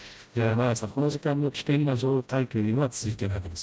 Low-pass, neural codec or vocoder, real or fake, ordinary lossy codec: none; codec, 16 kHz, 0.5 kbps, FreqCodec, smaller model; fake; none